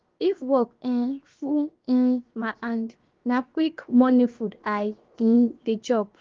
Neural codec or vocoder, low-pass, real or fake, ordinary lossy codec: codec, 16 kHz, 0.7 kbps, FocalCodec; 7.2 kHz; fake; Opus, 32 kbps